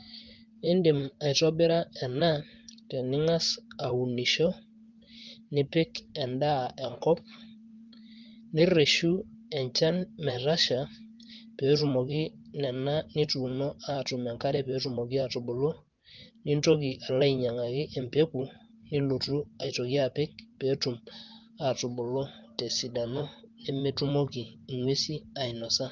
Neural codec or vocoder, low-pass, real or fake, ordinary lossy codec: vocoder, 44.1 kHz, 128 mel bands, Pupu-Vocoder; 7.2 kHz; fake; Opus, 32 kbps